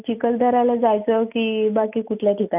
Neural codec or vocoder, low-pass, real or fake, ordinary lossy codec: none; 3.6 kHz; real; none